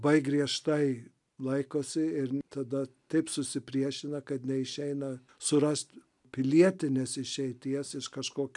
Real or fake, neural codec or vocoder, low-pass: real; none; 10.8 kHz